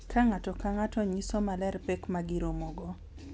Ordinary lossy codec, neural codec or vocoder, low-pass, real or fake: none; none; none; real